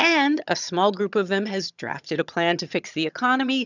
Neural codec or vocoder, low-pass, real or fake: vocoder, 22.05 kHz, 80 mel bands, HiFi-GAN; 7.2 kHz; fake